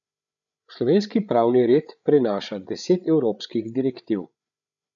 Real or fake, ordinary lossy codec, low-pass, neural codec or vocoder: fake; none; 7.2 kHz; codec, 16 kHz, 8 kbps, FreqCodec, larger model